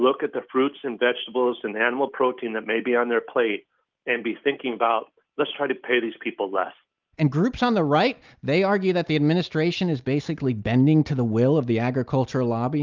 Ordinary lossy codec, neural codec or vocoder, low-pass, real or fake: Opus, 24 kbps; none; 7.2 kHz; real